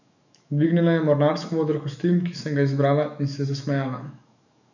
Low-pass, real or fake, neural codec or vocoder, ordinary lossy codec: 7.2 kHz; fake; autoencoder, 48 kHz, 128 numbers a frame, DAC-VAE, trained on Japanese speech; none